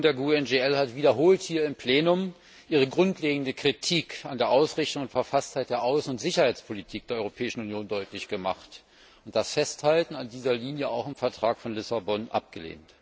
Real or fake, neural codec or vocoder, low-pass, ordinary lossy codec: real; none; none; none